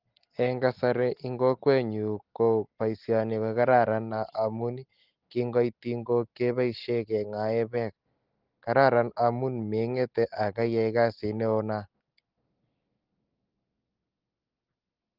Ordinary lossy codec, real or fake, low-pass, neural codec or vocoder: Opus, 16 kbps; real; 5.4 kHz; none